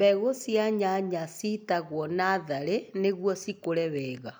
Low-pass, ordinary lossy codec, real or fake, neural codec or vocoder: none; none; real; none